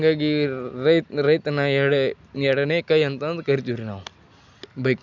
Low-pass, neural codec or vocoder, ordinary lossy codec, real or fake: 7.2 kHz; none; none; real